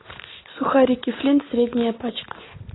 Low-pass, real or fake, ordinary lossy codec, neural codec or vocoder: 7.2 kHz; real; AAC, 16 kbps; none